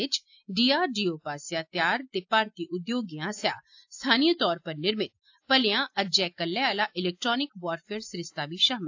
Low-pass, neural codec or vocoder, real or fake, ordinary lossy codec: 7.2 kHz; none; real; AAC, 48 kbps